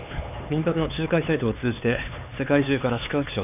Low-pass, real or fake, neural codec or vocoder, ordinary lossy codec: 3.6 kHz; fake; codec, 16 kHz, 4 kbps, X-Codec, HuBERT features, trained on LibriSpeech; none